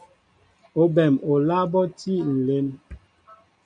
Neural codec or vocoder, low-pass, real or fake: none; 9.9 kHz; real